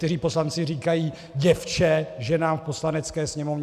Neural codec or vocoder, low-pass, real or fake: none; 14.4 kHz; real